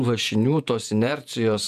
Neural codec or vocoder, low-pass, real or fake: vocoder, 48 kHz, 128 mel bands, Vocos; 14.4 kHz; fake